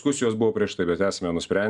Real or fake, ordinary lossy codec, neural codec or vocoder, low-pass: real; Opus, 64 kbps; none; 10.8 kHz